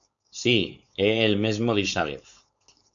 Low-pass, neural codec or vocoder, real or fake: 7.2 kHz; codec, 16 kHz, 4.8 kbps, FACodec; fake